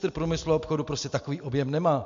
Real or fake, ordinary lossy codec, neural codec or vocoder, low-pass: real; MP3, 64 kbps; none; 7.2 kHz